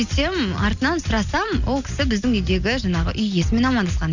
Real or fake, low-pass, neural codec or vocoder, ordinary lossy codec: real; 7.2 kHz; none; none